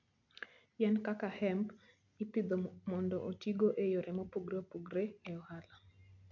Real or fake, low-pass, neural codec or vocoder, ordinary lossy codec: real; 7.2 kHz; none; none